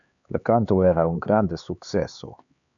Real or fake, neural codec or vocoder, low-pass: fake; codec, 16 kHz, 4 kbps, X-Codec, HuBERT features, trained on LibriSpeech; 7.2 kHz